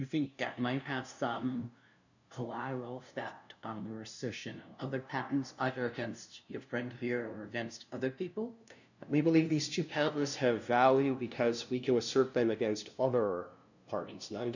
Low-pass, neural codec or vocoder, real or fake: 7.2 kHz; codec, 16 kHz, 0.5 kbps, FunCodec, trained on LibriTTS, 25 frames a second; fake